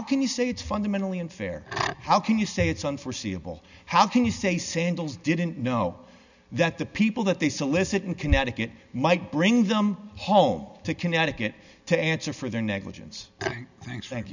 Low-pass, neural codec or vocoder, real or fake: 7.2 kHz; none; real